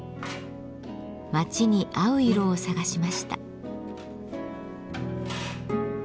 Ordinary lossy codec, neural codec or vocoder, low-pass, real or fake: none; none; none; real